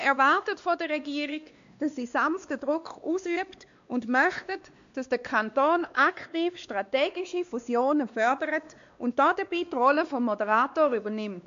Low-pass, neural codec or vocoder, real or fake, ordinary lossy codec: 7.2 kHz; codec, 16 kHz, 2 kbps, X-Codec, WavLM features, trained on Multilingual LibriSpeech; fake; MP3, 64 kbps